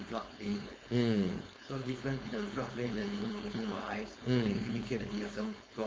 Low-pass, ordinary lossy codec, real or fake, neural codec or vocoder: none; none; fake; codec, 16 kHz, 4.8 kbps, FACodec